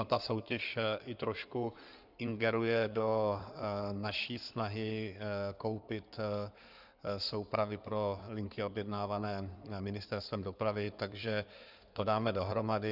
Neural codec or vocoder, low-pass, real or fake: codec, 16 kHz in and 24 kHz out, 2.2 kbps, FireRedTTS-2 codec; 5.4 kHz; fake